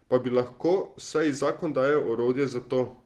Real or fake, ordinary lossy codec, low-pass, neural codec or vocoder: real; Opus, 16 kbps; 14.4 kHz; none